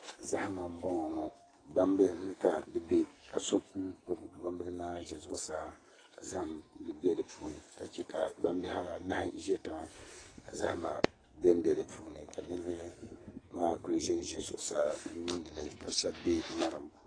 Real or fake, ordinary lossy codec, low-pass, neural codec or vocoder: fake; AAC, 32 kbps; 9.9 kHz; codec, 44.1 kHz, 2.6 kbps, SNAC